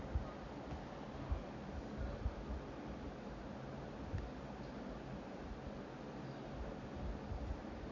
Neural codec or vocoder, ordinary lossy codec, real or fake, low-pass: none; none; real; 7.2 kHz